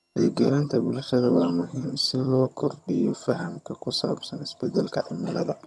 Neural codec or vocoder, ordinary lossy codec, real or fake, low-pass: vocoder, 22.05 kHz, 80 mel bands, HiFi-GAN; none; fake; none